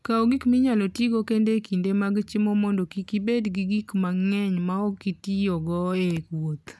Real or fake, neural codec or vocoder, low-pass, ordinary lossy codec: real; none; none; none